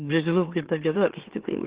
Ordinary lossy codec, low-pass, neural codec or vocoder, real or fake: Opus, 16 kbps; 3.6 kHz; autoencoder, 44.1 kHz, a latent of 192 numbers a frame, MeloTTS; fake